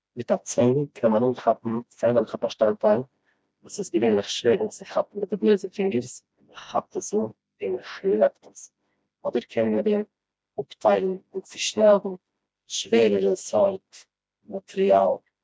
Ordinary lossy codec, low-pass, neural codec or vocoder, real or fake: none; none; codec, 16 kHz, 1 kbps, FreqCodec, smaller model; fake